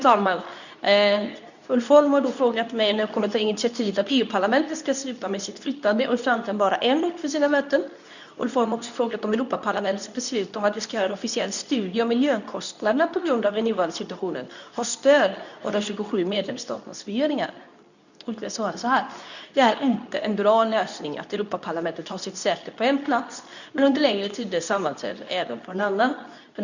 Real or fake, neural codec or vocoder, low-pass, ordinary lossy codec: fake; codec, 24 kHz, 0.9 kbps, WavTokenizer, medium speech release version 2; 7.2 kHz; none